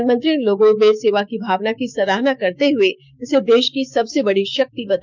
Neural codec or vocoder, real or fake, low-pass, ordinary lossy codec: codec, 16 kHz, 6 kbps, DAC; fake; none; none